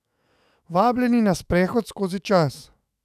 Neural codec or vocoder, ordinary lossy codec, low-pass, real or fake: autoencoder, 48 kHz, 128 numbers a frame, DAC-VAE, trained on Japanese speech; none; 14.4 kHz; fake